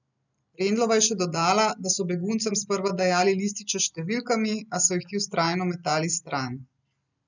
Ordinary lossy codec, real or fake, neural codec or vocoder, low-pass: none; real; none; 7.2 kHz